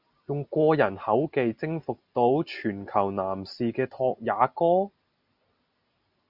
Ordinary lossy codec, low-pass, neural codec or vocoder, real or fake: Opus, 64 kbps; 5.4 kHz; none; real